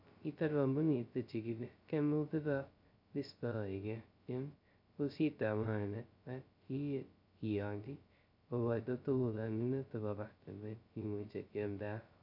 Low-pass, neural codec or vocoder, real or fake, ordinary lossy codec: 5.4 kHz; codec, 16 kHz, 0.2 kbps, FocalCodec; fake; none